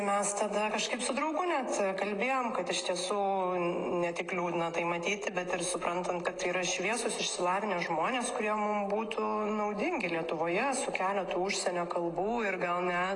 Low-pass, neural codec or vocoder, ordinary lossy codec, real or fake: 10.8 kHz; none; AAC, 32 kbps; real